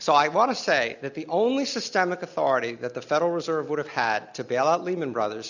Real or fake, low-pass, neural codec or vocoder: real; 7.2 kHz; none